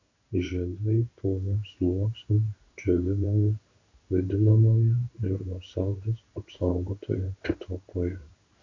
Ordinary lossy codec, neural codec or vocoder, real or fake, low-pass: MP3, 64 kbps; codec, 16 kHz in and 24 kHz out, 1 kbps, XY-Tokenizer; fake; 7.2 kHz